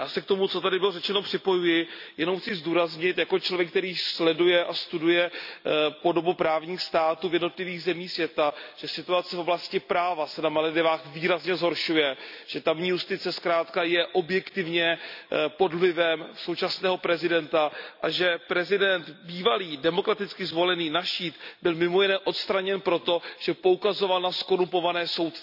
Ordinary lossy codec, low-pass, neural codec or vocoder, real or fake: none; 5.4 kHz; none; real